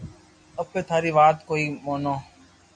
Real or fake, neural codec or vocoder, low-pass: real; none; 9.9 kHz